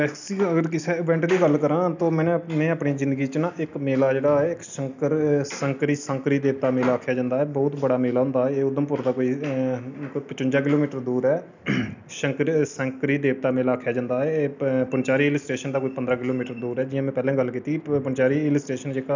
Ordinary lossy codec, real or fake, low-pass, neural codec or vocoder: none; real; 7.2 kHz; none